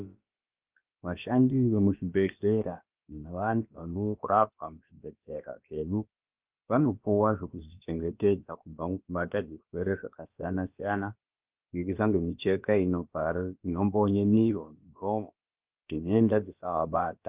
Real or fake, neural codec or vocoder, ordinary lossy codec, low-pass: fake; codec, 16 kHz, about 1 kbps, DyCAST, with the encoder's durations; Opus, 24 kbps; 3.6 kHz